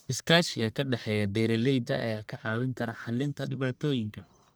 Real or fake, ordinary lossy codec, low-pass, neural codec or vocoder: fake; none; none; codec, 44.1 kHz, 1.7 kbps, Pupu-Codec